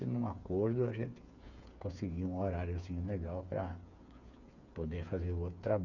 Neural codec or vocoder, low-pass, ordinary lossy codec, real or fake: codec, 16 kHz, 8 kbps, FreqCodec, smaller model; 7.2 kHz; none; fake